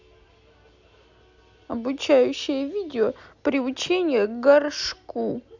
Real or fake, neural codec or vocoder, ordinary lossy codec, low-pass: real; none; none; 7.2 kHz